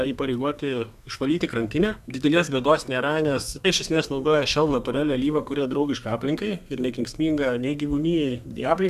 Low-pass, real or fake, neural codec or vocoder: 14.4 kHz; fake; codec, 32 kHz, 1.9 kbps, SNAC